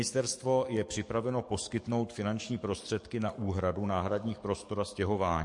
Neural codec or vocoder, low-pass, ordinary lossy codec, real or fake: codec, 44.1 kHz, 7.8 kbps, DAC; 10.8 kHz; MP3, 48 kbps; fake